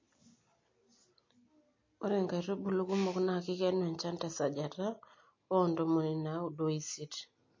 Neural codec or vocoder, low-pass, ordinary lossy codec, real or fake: none; 7.2 kHz; MP3, 32 kbps; real